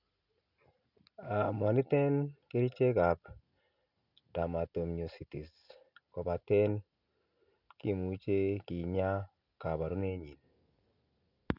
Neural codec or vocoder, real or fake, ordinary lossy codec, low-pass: none; real; none; 5.4 kHz